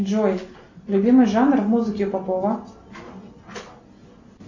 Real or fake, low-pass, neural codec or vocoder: real; 7.2 kHz; none